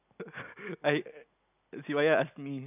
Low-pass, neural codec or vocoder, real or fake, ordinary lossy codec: 3.6 kHz; none; real; none